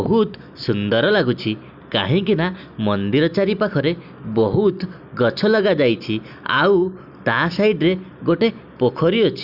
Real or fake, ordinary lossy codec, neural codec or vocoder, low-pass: real; none; none; 5.4 kHz